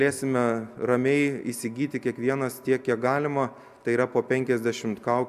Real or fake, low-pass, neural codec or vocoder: real; 14.4 kHz; none